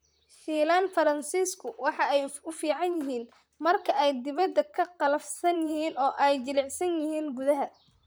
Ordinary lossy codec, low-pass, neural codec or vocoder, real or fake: none; none; vocoder, 44.1 kHz, 128 mel bands, Pupu-Vocoder; fake